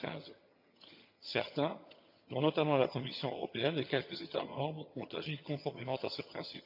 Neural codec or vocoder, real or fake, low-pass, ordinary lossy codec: vocoder, 22.05 kHz, 80 mel bands, HiFi-GAN; fake; 5.4 kHz; none